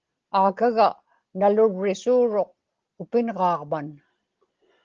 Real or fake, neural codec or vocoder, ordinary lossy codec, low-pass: real; none; Opus, 16 kbps; 7.2 kHz